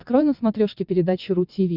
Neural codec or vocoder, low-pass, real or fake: vocoder, 22.05 kHz, 80 mel bands, WaveNeXt; 5.4 kHz; fake